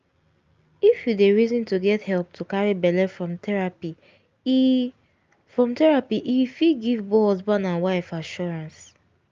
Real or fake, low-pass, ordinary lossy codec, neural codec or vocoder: real; 7.2 kHz; Opus, 32 kbps; none